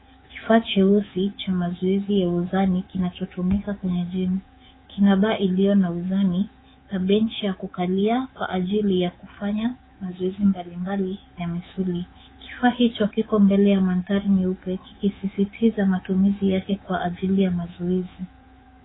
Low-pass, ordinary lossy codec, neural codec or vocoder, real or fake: 7.2 kHz; AAC, 16 kbps; codec, 24 kHz, 3.1 kbps, DualCodec; fake